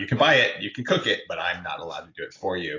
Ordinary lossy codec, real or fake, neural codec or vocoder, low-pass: AAC, 32 kbps; real; none; 7.2 kHz